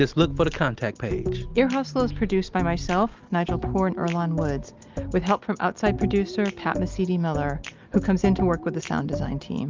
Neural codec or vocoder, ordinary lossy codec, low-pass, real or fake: none; Opus, 32 kbps; 7.2 kHz; real